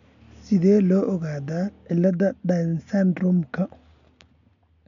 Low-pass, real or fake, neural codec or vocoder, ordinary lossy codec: 7.2 kHz; real; none; none